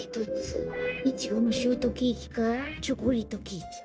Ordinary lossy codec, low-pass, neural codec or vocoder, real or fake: none; none; codec, 16 kHz, 0.9 kbps, LongCat-Audio-Codec; fake